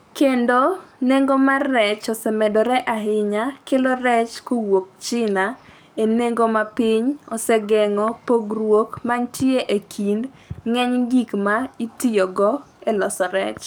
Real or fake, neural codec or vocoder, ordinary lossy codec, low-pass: fake; codec, 44.1 kHz, 7.8 kbps, DAC; none; none